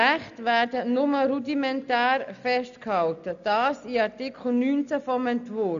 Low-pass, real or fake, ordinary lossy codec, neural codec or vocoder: 7.2 kHz; real; none; none